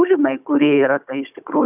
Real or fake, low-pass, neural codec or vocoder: fake; 3.6 kHz; codec, 16 kHz, 16 kbps, FunCodec, trained on Chinese and English, 50 frames a second